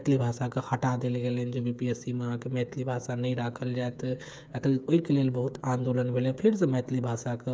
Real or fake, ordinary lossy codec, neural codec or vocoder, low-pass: fake; none; codec, 16 kHz, 8 kbps, FreqCodec, smaller model; none